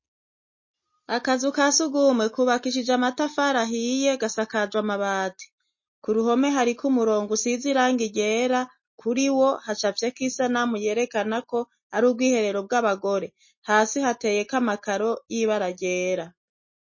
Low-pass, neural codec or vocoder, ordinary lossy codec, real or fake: 7.2 kHz; none; MP3, 32 kbps; real